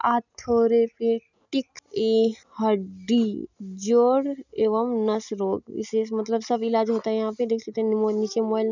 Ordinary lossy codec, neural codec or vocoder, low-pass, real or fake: none; none; 7.2 kHz; real